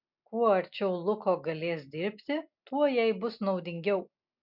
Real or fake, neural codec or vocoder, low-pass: real; none; 5.4 kHz